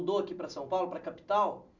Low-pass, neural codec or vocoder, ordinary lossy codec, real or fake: 7.2 kHz; none; none; real